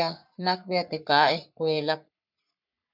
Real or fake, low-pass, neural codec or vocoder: fake; 5.4 kHz; codec, 44.1 kHz, 3.4 kbps, Pupu-Codec